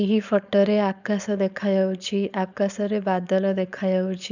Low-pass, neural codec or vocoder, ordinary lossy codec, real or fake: 7.2 kHz; codec, 16 kHz, 4.8 kbps, FACodec; none; fake